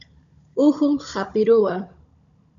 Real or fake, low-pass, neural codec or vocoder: fake; 7.2 kHz; codec, 16 kHz, 16 kbps, FunCodec, trained on Chinese and English, 50 frames a second